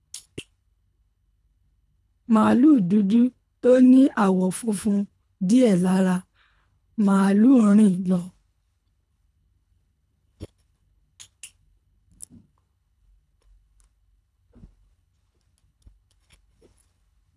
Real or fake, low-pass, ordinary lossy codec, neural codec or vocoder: fake; none; none; codec, 24 kHz, 3 kbps, HILCodec